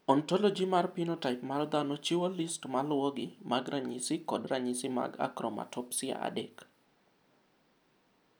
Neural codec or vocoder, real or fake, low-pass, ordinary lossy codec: none; real; none; none